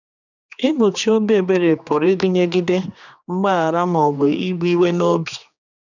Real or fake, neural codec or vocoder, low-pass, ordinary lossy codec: fake; codec, 16 kHz, 2 kbps, X-Codec, HuBERT features, trained on general audio; 7.2 kHz; none